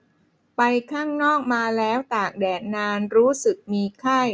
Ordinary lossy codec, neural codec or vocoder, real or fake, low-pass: none; none; real; none